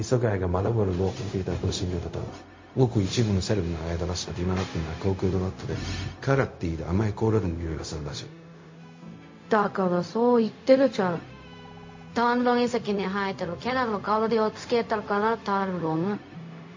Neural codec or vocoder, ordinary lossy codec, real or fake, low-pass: codec, 16 kHz, 0.4 kbps, LongCat-Audio-Codec; MP3, 32 kbps; fake; 7.2 kHz